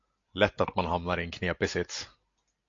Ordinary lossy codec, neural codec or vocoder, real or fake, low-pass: AAC, 48 kbps; none; real; 7.2 kHz